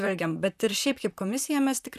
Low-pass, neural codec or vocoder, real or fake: 14.4 kHz; vocoder, 44.1 kHz, 128 mel bands, Pupu-Vocoder; fake